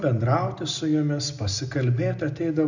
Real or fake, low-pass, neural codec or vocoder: real; 7.2 kHz; none